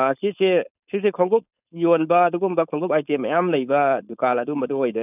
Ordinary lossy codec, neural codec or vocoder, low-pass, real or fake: none; codec, 16 kHz, 4.8 kbps, FACodec; 3.6 kHz; fake